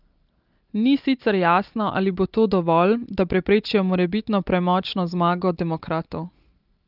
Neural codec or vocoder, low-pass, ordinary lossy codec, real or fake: none; 5.4 kHz; Opus, 24 kbps; real